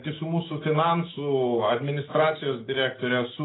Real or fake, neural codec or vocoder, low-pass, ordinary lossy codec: real; none; 7.2 kHz; AAC, 16 kbps